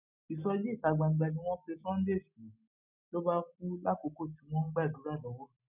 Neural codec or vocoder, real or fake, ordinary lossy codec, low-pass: none; real; none; 3.6 kHz